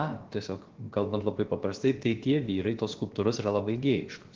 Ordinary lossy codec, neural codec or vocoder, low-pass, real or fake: Opus, 16 kbps; codec, 16 kHz, about 1 kbps, DyCAST, with the encoder's durations; 7.2 kHz; fake